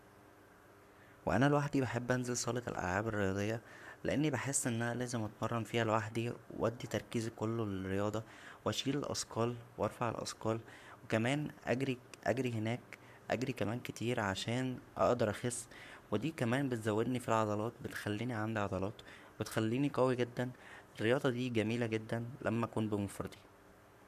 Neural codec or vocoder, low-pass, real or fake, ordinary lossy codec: codec, 44.1 kHz, 7.8 kbps, Pupu-Codec; 14.4 kHz; fake; none